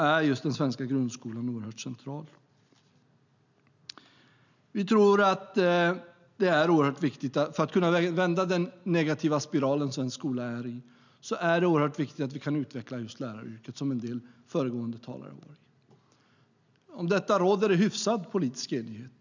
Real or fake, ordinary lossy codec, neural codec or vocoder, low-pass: real; none; none; 7.2 kHz